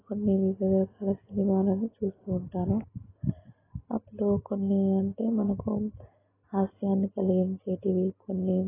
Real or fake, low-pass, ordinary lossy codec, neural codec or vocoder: real; 3.6 kHz; AAC, 16 kbps; none